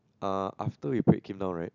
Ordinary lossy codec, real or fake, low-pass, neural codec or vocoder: none; real; 7.2 kHz; none